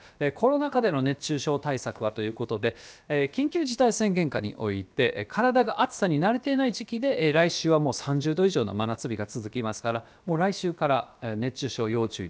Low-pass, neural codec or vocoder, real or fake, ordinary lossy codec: none; codec, 16 kHz, about 1 kbps, DyCAST, with the encoder's durations; fake; none